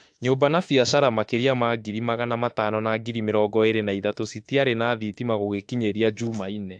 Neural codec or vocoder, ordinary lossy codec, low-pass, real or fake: autoencoder, 48 kHz, 32 numbers a frame, DAC-VAE, trained on Japanese speech; AAC, 64 kbps; 9.9 kHz; fake